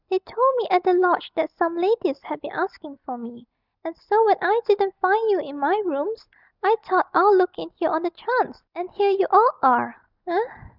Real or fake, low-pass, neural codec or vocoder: real; 5.4 kHz; none